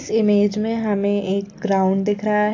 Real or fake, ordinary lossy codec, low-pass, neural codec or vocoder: real; AAC, 48 kbps; 7.2 kHz; none